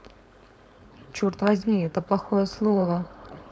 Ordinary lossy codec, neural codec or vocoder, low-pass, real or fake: none; codec, 16 kHz, 4.8 kbps, FACodec; none; fake